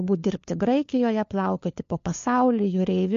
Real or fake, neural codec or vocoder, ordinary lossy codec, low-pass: fake; codec, 16 kHz, 4 kbps, FunCodec, trained on LibriTTS, 50 frames a second; MP3, 48 kbps; 7.2 kHz